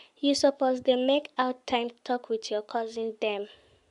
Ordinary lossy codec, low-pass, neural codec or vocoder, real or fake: none; 10.8 kHz; codec, 44.1 kHz, 7.8 kbps, Pupu-Codec; fake